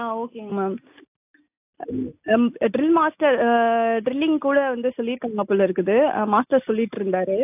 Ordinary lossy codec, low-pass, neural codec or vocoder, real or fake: AAC, 32 kbps; 3.6 kHz; none; real